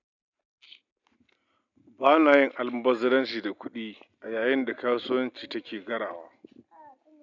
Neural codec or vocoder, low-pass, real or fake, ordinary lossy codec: none; 7.2 kHz; real; none